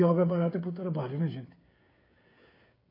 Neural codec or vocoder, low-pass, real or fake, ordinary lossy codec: codec, 16 kHz, 16 kbps, FreqCodec, smaller model; 5.4 kHz; fake; AAC, 24 kbps